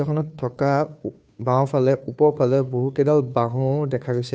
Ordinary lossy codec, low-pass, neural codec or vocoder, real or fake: none; none; codec, 16 kHz, 2 kbps, FunCodec, trained on Chinese and English, 25 frames a second; fake